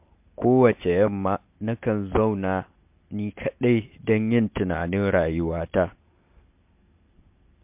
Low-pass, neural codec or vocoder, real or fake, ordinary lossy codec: 3.6 kHz; none; real; MP3, 32 kbps